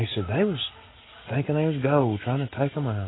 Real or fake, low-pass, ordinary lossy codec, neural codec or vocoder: real; 7.2 kHz; AAC, 16 kbps; none